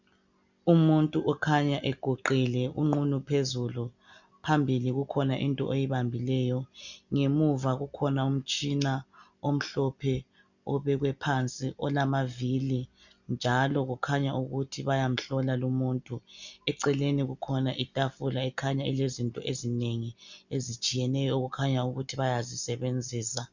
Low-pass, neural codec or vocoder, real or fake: 7.2 kHz; none; real